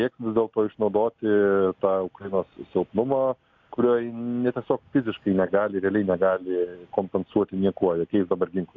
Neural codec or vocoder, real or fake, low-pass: none; real; 7.2 kHz